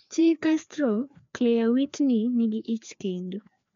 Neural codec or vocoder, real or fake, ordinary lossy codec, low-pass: codec, 16 kHz, 2 kbps, FreqCodec, larger model; fake; MP3, 64 kbps; 7.2 kHz